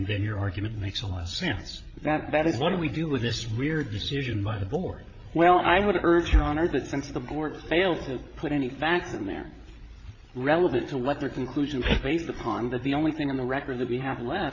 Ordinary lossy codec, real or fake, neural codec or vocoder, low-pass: AAC, 48 kbps; fake; codec, 16 kHz, 8 kbps, FreqCodec, larger model; 7.2 kHz